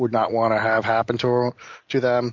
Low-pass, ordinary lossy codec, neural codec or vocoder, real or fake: 7.2 kHz; MP3, 64 kbps; none; real